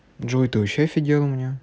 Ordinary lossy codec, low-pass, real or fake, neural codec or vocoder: none; none; real; none